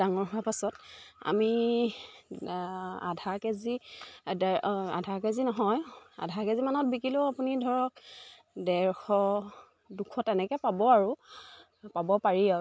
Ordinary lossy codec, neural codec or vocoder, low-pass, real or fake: none; none; none; real